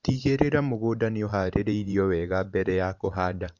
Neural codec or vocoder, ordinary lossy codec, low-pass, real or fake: vocoder, 44.1 kHz, 128 mel bands every 512 samples, BigVGAN v2; none; 7.2 kHz; fake